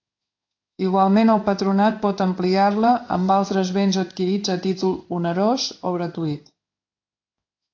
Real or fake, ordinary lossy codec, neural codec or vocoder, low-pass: fake; AAC, 48 kbps; codec, 16 kHz in and 24 kHz out, 1 kbps, XY-Tokenizer; 7.2 kHz